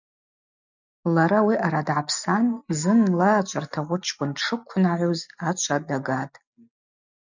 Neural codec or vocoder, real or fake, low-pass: none; real; 7.2 kHz